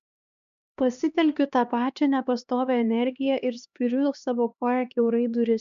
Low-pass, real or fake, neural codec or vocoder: 7.2 kHz; fake; codec, 16 kHz, 2 kbps, X-Codec, HuBERT features, trained on LibriSpeech